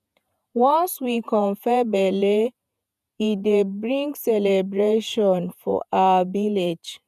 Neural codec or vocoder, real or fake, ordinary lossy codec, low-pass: vocoder, 48 kHz, 128 mel bands, Vocos; fake; none; 14.4 kHz